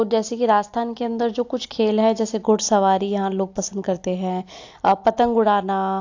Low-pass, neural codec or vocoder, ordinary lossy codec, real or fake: 7.2 kHz; none; AAC, 48 kbps; real